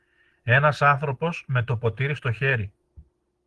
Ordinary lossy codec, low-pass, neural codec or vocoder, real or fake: Opus, 16 kbps; 10.8 kHz; none; real